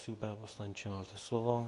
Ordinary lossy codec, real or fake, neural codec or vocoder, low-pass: AAC, 48 kbps; fake; codec, 24 kHz, 0.9 kbps, WavTokenizer, medium speech release version 2; 10.8 kHz